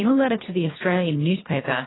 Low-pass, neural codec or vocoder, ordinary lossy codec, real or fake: 7.2 kHz; codec, 24 kHz, 1.5 kbps, HILCodec; AAC, 16 kbps; fake